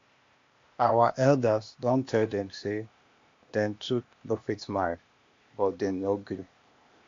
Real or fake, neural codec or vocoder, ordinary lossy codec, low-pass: fake; codec, 16 kHz, 0.8 kbps, ZipCodec; MP3, 48 kbps; 7.2 kHz